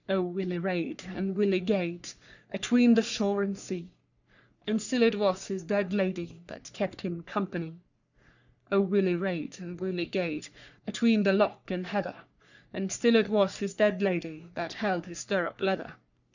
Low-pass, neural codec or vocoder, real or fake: 7.2 kHz; codec, 44.1 kHz, 3.4 kbps, Pupu-Codec; fake